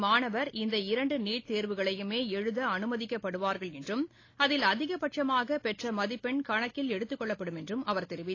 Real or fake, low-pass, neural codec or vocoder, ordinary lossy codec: real; 7.2 kHz; none; AAC, 32 kbps